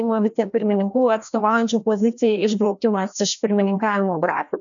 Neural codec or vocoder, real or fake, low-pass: codec, 16 kHz, 1 kbps, FreqCodec, larger model; fake; 7.2 kHz